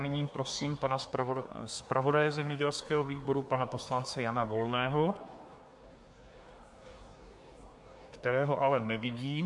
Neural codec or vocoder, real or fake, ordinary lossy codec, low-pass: codec, 24 kHz, 1 kbps, SNAC; fake; MP3, 64 kbps; 10.8 kHz